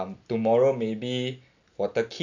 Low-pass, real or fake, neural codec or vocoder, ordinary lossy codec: 7.2 kHz; real; none; none